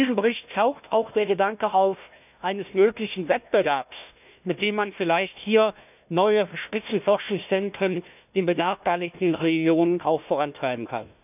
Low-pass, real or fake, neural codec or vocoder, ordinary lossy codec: 3.6 kHz; fake; codec, 16 kHz, 1 kbps, FunCodec, trained on Chinese and English, 50 frames a second; none